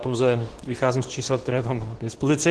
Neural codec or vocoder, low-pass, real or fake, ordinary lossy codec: codec, 24 kHz, 0.9 kbps, WavTokenizer, medium speech release version 2; 10.8 kHz; fake; Opus, 16 kbps